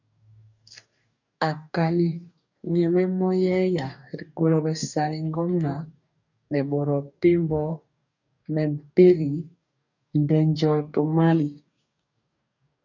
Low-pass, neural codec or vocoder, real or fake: 7.2 kHz; codec, 44.1 kHz, 2.6 kbps, DAC; fake